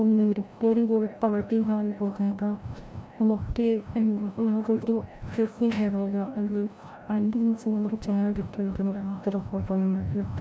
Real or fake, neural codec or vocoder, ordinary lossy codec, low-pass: fake; codec, 16 kHz, 0.5 kbps, FreqCodec, larger model; none; none